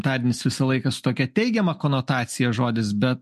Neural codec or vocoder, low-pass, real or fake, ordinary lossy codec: none; 14.4 kHz; real; MP3, 64 kbps